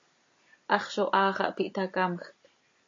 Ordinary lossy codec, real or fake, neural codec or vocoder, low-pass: AAC, 48 kbps; real; none; 7.2 kHz